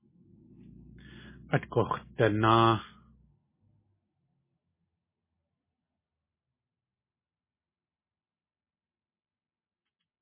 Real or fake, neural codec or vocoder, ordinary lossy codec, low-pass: real; none; MP3, 16 kbps; 3.6 kHz